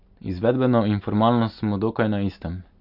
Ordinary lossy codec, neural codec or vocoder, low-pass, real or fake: none; none; 5.4 kHz; real